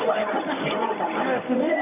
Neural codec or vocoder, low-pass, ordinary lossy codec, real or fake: codec, 24 kHz, 0.9 kbps, WavTokenizer, medium speech release version 1; 3.6 kHz; none; fake